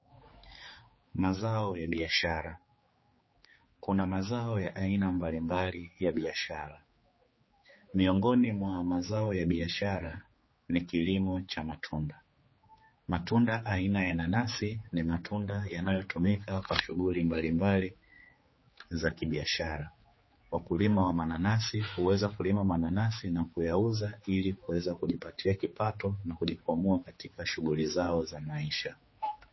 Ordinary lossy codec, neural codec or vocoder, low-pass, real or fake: MP3, 24 kbps; codec, 16 kHz, 4 kbps, X-Codec, HuBERT features, trained on general audio; 7.2 kHz; fake